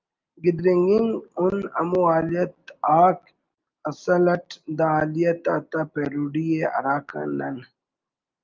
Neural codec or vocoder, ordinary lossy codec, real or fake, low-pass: none; Opus, 24 kbps; real; 7.2 kHz